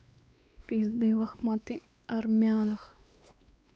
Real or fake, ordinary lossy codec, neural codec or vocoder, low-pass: fake; none; codec, 16 kHz, 2 kbps, X-Codec, WavLM features, trained on Multilingual LibriSpeech; none